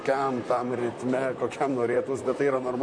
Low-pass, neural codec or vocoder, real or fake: 9.9 kHz; vocoder, 44.1 kHz, 128 mel bands, Pupu-Vocoder; fake